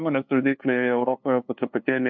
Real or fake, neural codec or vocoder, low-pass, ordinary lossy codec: fake; codec, 16 kHz, 2 kbps, FunCodec, trained on LibriTTS, 25 frames a second; 7.2 kHz; MP3, 48 kbps